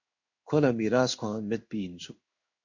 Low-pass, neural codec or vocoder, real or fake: 7.2 kHz; codec, 16 kHz in and 24 kHz out, 1 kbps, XY-Tokenizer; fake